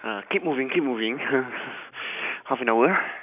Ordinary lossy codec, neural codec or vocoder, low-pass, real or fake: none; none; 3.6 kHz; real